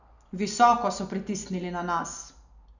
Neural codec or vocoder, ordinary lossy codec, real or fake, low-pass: none; none; real; 7.2 kHz